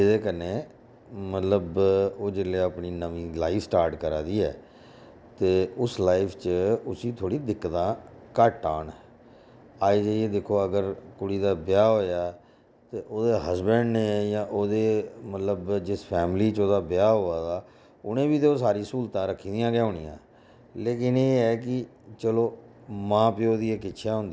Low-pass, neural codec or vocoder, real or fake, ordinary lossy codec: none; none; real; none